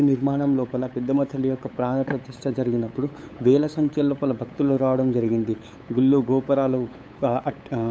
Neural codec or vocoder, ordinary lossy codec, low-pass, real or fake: codec, 16 kHz, 8 kbps, FunCodec, trained on LibriTTS, 25 frames a second; none; none; fake